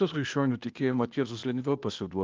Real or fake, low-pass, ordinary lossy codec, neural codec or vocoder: fake; 7.2 kHz; Opus, 24 kbps; codec, 16 kHz, 0.8 kbps, ZipCodec